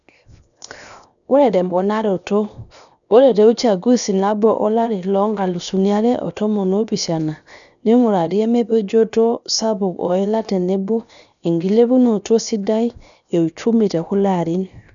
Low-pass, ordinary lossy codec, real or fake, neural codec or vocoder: 7.2 kHz; none; fake; codec, 16 kHz, 0.7 kbps, FocalCodec